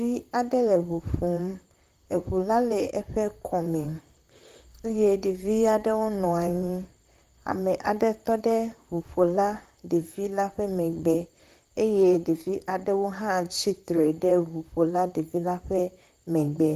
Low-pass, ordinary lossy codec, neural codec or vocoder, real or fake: 14.4 kHz; Opus, 32 kbps; vocoder, 44.1 kHz, 128 mel bands, Pupu-Vocoder; fake